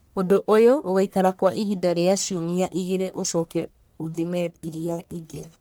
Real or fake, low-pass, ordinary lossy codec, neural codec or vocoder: fake; none; none; codec, 44.1 kHz, 1.7 kbps, Pupu-Codec